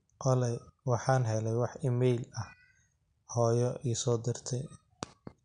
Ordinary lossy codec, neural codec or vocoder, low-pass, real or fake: MP3, 64 kbps; none; 9.9 kHz; real